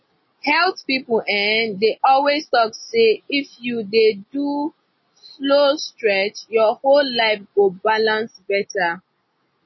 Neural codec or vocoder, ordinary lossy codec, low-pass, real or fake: none; MP3, 24 kbps; 7.2 kHz; real